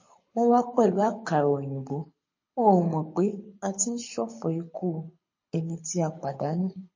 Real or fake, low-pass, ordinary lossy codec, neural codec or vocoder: fake; 7.2 kHz; MP3, 32 kbps; codec, 24 kHz, 6 kbps, HILCodec